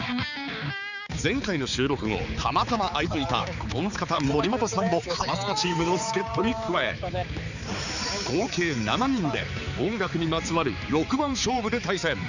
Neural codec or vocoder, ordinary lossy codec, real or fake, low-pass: codec, 16 kHz, 4 kbps, X-Codec, HuBERT features, trained on balanced general audio; none; fake; 7.2 kHz